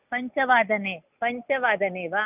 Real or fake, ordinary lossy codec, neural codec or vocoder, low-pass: real; none; none; 3.6 kHz